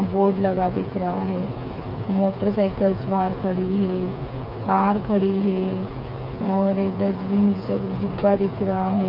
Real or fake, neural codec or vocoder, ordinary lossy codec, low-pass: fake; codec, 16 kHz, 4 kbps, FreqCodec, smaller model; none; 5.4 kHz